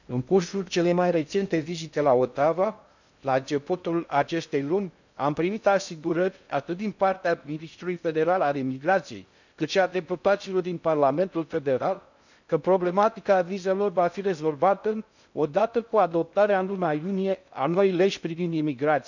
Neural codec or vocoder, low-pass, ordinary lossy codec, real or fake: codec, 16 kHz in and 24 kHz out, 0.6 kbps, FocalCodec, streaming, 2048 codes; 7.2 kHz; none; fake